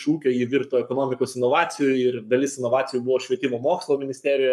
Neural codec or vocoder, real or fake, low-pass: codec, 44.1 kHz, 7.8 kbps, Pupu-Codec; fake; 14.4 kHz